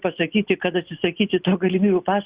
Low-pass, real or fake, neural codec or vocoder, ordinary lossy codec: 3.6 kHz; real; none; Opus, 64 kbps